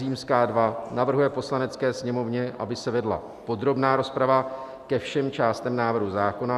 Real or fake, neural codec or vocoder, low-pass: real; none; 14.4 kHz